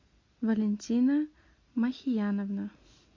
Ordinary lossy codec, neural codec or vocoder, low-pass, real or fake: MP3, 48 kbps; none; 7.2 kHz; real